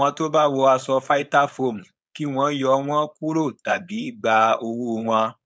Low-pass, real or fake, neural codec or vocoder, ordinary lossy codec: none; fake; codec, 16 kHz, 4.8 kbps, FACodec; none